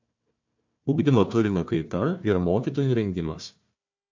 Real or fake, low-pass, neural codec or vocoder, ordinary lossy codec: fake; 7.2 kHz; codec, 16 kHz, 1 kbps, FunCodec, trained on Chinese and English, 50 frames a second; MP3, 64 kbps